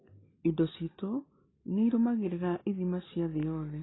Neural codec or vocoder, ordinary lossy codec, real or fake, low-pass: none; AAC, 16 kbps; real; 7.2 kHz